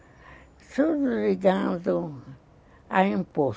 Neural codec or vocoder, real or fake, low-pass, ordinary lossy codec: none; real; none; none